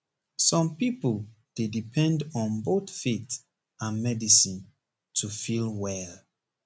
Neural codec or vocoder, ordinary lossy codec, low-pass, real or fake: none; none; none; real